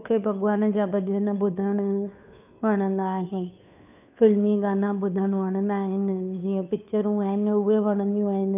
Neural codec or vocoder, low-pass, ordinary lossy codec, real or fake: codec, 16 kHz, 2 kbps, FunCodec, trained on Chinese and English, 25 frames a second; 3.6 kHz; none; fake